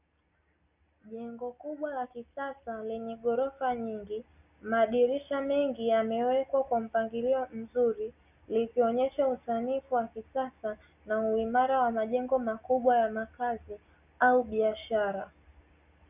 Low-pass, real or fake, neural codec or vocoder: 3.6 kHz; real; none